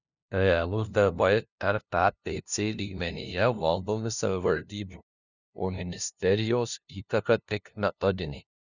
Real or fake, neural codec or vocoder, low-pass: fake; codec, 16 kHz, 0.5 kbps, FunCodec, trained on LibriTTS, 25 frames a second; 7.2 kHz